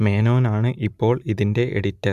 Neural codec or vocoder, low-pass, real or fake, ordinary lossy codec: none; 14.4 kHz; real; none